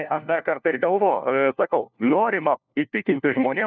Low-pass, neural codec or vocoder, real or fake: 7.2 kHz; codec, 16 kHz, 1 kbps, FunCodec, trained on LibriTTS, 50 frames a second; fake